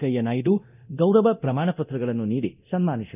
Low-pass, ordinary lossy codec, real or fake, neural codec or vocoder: 3.6 kHz; none; fake; codec, 24 kHz, 0.9 kbps, DualCodec